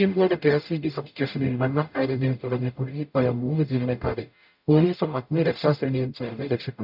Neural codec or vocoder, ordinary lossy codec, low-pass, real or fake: codec, 44.1 kHz, 0.9 kbps, DAC; none; 5.4 kHz; fake